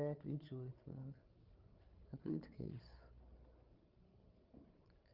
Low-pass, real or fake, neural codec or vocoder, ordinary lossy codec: 5.4 kHz; fake; codec, 16 kHz, 8 kbps, FreqCodec, larger model; Opus, 32 kbps